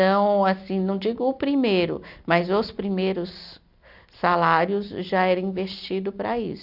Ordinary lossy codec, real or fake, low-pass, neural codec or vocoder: none; real; 5.4 kHz; none